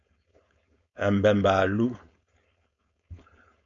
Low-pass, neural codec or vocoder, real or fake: 7.2 kHz; codec, 16 kHz, 4.8 kbps, FACodec; fake